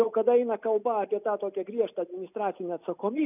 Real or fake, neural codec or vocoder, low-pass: real; none; 3.6 kHz